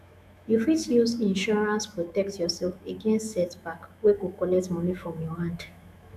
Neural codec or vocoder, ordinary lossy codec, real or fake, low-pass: autoencoder, 48 kHz, 128 numbers a frame, DAC-VAE, trained on Japanese speech; none; fake; 14.4 kHz